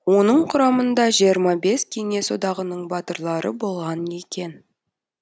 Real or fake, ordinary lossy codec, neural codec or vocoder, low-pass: real; none; none; none